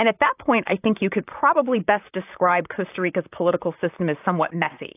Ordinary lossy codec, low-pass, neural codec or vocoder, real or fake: AAC, 32 kbps; 3.6 kHz; vocoder, 44.1 kHz, 128 mel bands, Pupu-Vocoder; fake